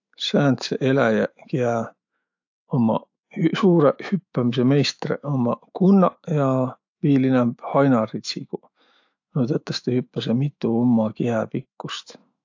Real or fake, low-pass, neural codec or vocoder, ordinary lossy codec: fake; 7.2 kHz; autoencoder, 48 kHz, 128 numbers a frame, DAC-VAE, trained on Japanese speech; AAC, 48 kbps